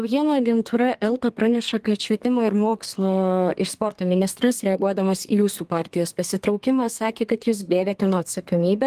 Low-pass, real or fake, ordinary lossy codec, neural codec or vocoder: 14.4 kHz; fake; Opus, 24 kbps; codec, 32 kHz, 1.9 kbps, SNAC